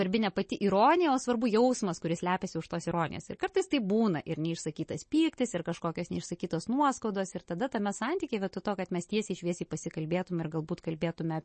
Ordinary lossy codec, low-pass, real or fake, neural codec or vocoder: MP3, 32 kbps; 10.8 kHz; real; none